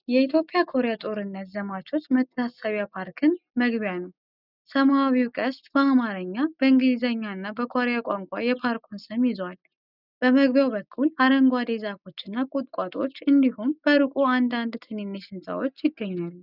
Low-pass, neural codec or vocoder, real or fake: 5.4 kHz; none; real